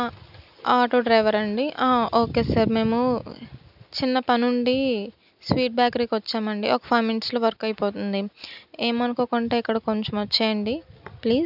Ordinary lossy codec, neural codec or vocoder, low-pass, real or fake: none; none; 5.4 kHz; real